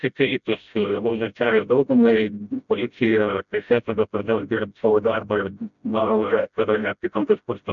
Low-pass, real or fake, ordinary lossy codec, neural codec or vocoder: 7.2 kHz; fake; MP3, 64 kbps; codec, 16 kHz, 0.5 kbps, FreqCodec, smaller model